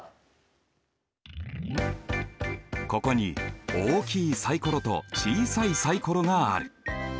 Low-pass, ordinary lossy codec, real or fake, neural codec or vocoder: none; none; real; none